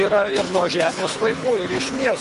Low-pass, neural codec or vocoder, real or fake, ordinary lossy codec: 10.8 kHz; codec, 24 kHz, 3 kbps, HILCodec; fake; MP3, 48 kbps